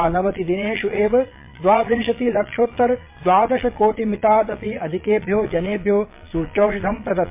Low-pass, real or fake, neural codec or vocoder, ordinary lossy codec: 3.6 kHz; fake; vocoder, 22.05 kHz, 80 mel bands, Vocos; MP3, 24 kbps